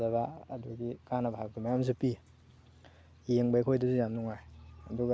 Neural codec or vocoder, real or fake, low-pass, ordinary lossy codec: none; real; none; none